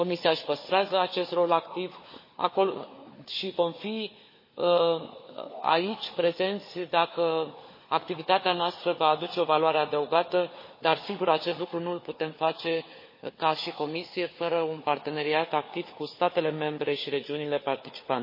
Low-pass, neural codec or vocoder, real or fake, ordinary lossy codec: 5.4 kHz; codec, 16 kHz, 4 kbps, FunCodec, trained on Chinese and English, 50 frames a second; fake; MP3, 24 kbps